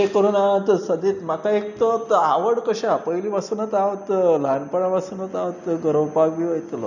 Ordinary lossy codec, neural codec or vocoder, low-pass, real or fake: none; none; 7.2 kHz; real